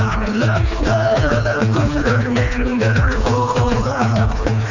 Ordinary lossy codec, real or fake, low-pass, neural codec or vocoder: none; fake; 7.2 kHz; codec, 16 kHz, 2 kbps, FreqCodec, smaller model